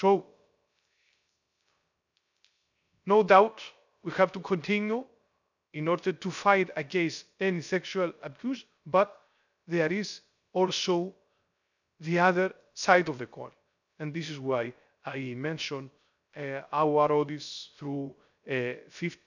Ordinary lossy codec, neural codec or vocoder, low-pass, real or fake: none; codec, 16 kHz, 0.3 kbps, FocalCodec; 7.2 kHz; fake